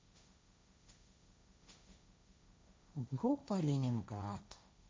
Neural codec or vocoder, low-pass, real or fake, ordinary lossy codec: codec, 16 kHz, 1.1 kbps, Voila-Tokenizer; none; fake; none